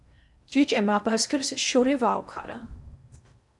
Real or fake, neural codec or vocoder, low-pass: fake; codec, 16 kHz in and 24 kHz out, 0.6 kbps, FocalCodec, streaming, 2048 codes; 10.8 kHz